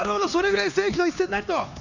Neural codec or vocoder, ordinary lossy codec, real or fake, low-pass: codec, 16 kHz, 2 kbps, X-Codec, HuBERT features, trained on LibriSpeech; none; fake; 7.2 kHz